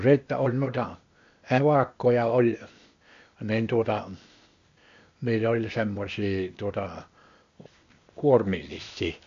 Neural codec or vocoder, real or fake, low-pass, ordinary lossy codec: codec, 16 kHz, 0.8 kbps, ZipCodec; fake; 7.2 kHz; MP3, 48 kbps